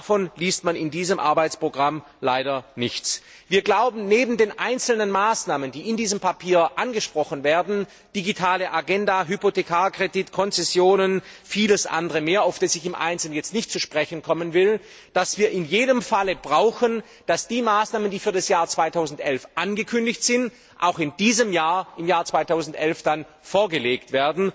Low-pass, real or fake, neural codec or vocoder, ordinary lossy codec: none; real; none; none